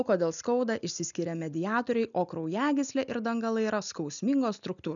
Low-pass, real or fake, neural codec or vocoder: 7.2 kHz; real; none